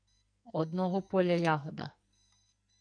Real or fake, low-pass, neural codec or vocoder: fake; 9.9 kHz; codec, 44.1 kHz, 2.6 kbps, SNAC